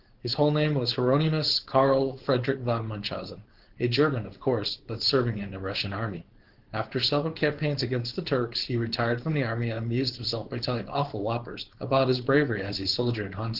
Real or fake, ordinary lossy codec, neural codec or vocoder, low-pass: fake; Opus, 16 kbps; codec, 16 kHz, 4.8 kbps, FACodec; 5.4 kHz